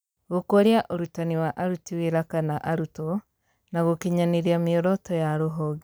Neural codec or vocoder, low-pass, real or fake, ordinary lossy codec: none; none; real; none